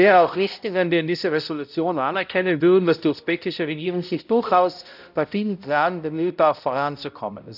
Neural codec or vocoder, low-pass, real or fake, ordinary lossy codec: codec, 16 kHz, 0.5 kbps, X-Codec, HuBERT features, trained on balanced general audio; 5.4 kHz; fake; none